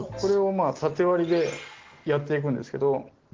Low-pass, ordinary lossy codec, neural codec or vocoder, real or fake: 7.2 kHz; Opus, 16 kbps; none; real